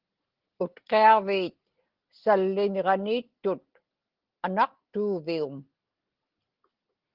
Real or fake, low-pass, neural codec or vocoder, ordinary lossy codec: real; 5.4 kHz; none; Opus, 16 kbps